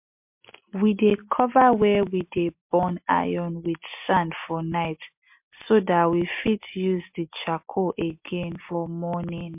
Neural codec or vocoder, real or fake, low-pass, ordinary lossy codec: none; real; 3.6 kHz; MP3, 32 kbps